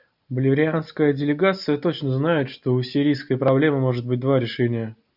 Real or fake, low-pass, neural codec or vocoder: real; 5.4 kHz; none